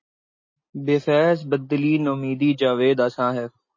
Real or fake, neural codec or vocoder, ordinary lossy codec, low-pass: real; none; MP3, 32 kbps; 7.2 kHz